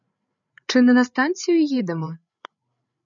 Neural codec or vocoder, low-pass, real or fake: codec, 16 kHz, 8 kbps, FreqCodec, larger model; 7.2 kHz; fake